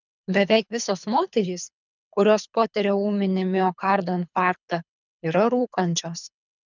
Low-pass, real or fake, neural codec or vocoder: 7.2 kHz; fake; codec, 24 kHz, 3 kbps, HILCodec